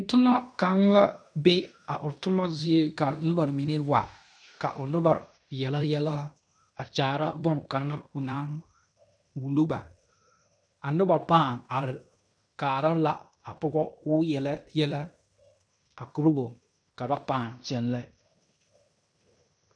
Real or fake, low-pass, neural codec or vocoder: fake; 9.9 kHz; codec, 16 kHz in and 24 kHz out, 0.9 kbps, LongCat-Audio-Codec, fine tuned four codebook decoder